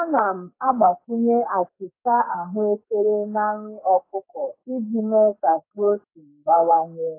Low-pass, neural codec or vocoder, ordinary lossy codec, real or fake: 3.6 kHz; codec, 44.1 kHz, 2.6 kbps, SNAC; AAC, 24 kbps; fake